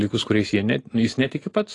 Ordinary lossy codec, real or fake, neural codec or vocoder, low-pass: AAC, 32 kbps; real; none; 10.8 kHz